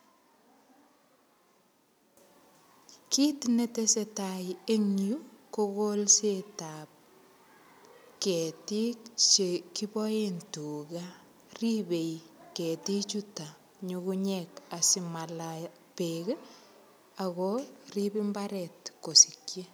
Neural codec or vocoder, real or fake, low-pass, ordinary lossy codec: none; real; none; none